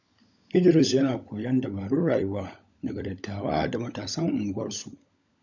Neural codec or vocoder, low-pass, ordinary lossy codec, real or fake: codec, 16 kHz, 16 kbps, FunCodec, trained on LibriTTS, 50 frames a second; 7.2 kHz; none; fake